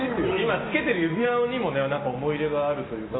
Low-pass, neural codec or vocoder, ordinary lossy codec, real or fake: 7.2 kHz; none; AAC, 16 kbps; real